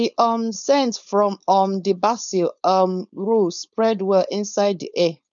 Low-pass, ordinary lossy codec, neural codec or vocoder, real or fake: 7.2 kHz; none; codec, 16 kHz, 4.8 kbps, FACodec; fake